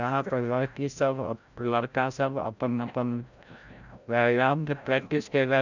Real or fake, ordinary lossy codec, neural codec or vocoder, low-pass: fake; none; codec, 16 kHz, 0.5 kbps, FreqCodec, larger model; 7.2 kHz